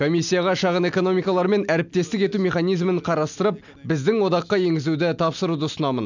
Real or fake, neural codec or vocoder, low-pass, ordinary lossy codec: real; none; 7.2 kHz; none